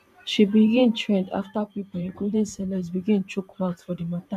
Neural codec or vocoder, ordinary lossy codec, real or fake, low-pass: vocoder, 44.1 kHz, 128 mel bands every 512 samples, BigVGAN v2; none; fake; 14.4 kHz